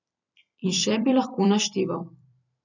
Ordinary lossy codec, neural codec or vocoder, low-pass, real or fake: none; vocoder, 44.1 kHz, 128 mel bands every 256 samples, BigVGAN v2; 7.2 kHz; fake